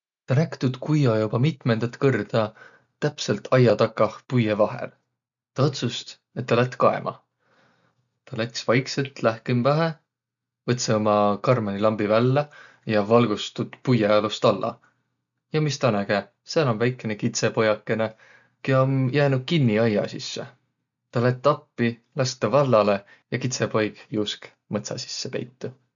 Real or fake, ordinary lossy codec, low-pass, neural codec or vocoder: real; none; 7.2 kHz; none